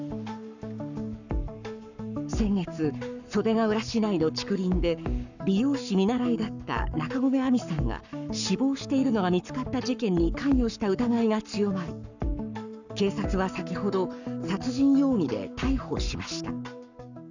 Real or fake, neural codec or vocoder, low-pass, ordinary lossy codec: fake; codec, 44.1 kHz, 7.8 kbps, Pupu-Codec; 7.2 kHz; none